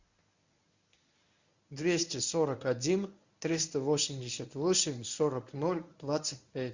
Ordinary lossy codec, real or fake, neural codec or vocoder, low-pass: Opus, 64 kbps; fake; codec, 24 kHz, 0.9 kbps, WavTokenizer, medium speech release version 1; 7.2 kHz